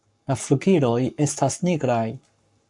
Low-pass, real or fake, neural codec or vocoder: 10.8 kHz; fake; codec, 44.1 kHz, 7.8 kbps, Pupu-Codec